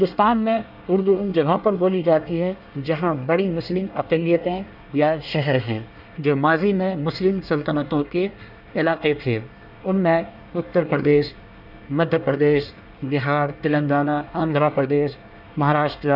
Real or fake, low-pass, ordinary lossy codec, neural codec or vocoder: fake; 5.4 kHz; none; codec, 24 kHz, 1 kbps, SNAC